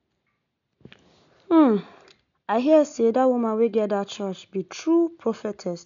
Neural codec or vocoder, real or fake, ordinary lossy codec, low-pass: none; real; none; 7.2 kHz